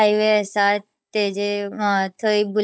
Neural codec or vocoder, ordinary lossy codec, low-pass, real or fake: codec, 16 kHz, 16 kbps, FunCodec, trained on Chinese and English, 50 frames a second; none; none; fake